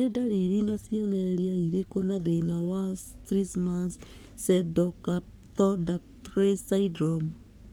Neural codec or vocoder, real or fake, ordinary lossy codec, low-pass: codec, 44.1 kHz, 3.4 kbps, Pupu-Codec; fake; none; none